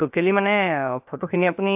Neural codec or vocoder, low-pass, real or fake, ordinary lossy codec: codec, 16 kHz, 0.7 kbps, FocalCodec; 3.6 kHz; fake; AAC, 32 kbps